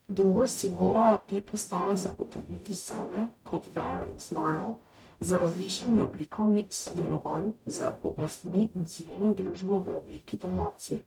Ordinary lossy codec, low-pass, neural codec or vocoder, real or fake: none; 19.8 kHz; codec, 44.1 kHz, 0.9 kbps, DAC; fake